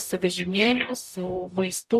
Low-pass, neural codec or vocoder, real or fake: 14.4 kHz; codec, 44.1 kHz, 0.9 kbps, DAC; fake